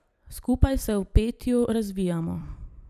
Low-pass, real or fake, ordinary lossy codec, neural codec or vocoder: 14.4 kHz; fake; none; vocoder, 44.1 kHz, 128 mel bands every 256 samples, BigVGAN v2